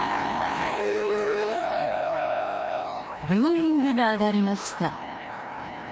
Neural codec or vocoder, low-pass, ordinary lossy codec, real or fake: codec, 16 kHz, 1 kbps, FreqCodec, larger model; none; none; fake